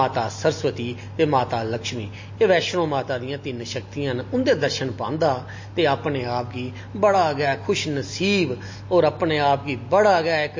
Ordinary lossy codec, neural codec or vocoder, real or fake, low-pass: MP3, 32 kbps; none; real; 7.2 kHz